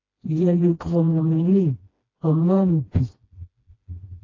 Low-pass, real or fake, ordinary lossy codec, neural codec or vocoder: 7.2 kHz; fake; AAC, 32 kbps; codec, 16 kHz, 1 kbps, FreqCodec, smaller model